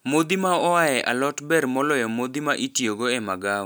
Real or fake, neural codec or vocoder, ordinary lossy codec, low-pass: real; none; none; none